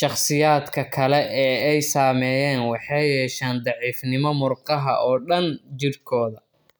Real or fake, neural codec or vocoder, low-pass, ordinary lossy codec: real; none; none; none